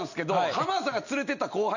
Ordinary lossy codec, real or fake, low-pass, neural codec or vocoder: none; real; 7.2 kHz; none